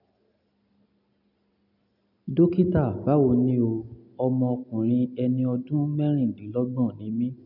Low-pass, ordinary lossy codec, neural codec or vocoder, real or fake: 5.4 kHz; none; none; real